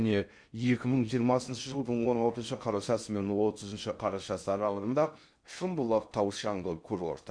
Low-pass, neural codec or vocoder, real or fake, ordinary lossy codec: 9.9 kHz; codec, 16 kHz in and 24 kHz out, 0.6 kbps, FocalCodec, streaming, 2048 codes; fake; MP3, 64 kbps